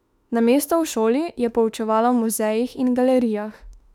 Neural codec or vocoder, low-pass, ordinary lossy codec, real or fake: autoencoder, 48 kHz, 32 numbers a frame, DAC-VAE, trained on Japanese speech; 19.8 kHz; none; fake